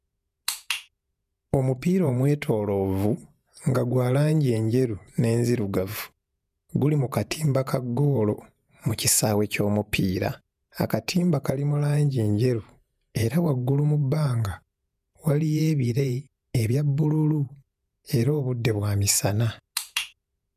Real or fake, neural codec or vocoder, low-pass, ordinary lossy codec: fake; vocoder, 48 kHz, 128 mel bands, Vocos; 14.4 kHz; none